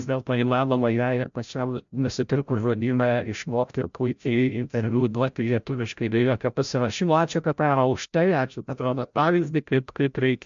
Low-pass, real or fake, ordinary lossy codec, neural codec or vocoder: 7.2 kHz; fake; MP3, 64 kbps; codec, 16 kHz, 0.5 kbps, FreqCodec, larger model